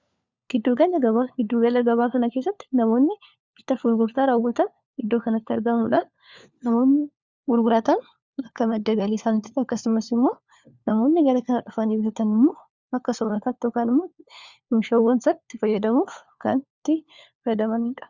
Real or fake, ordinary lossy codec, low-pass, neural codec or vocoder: fake; Opus, 64 kbps; 7.2 kHz; codec, 16 kHz, 4 kbps, FunCodec, trained on LibriTTS, 50 frames a second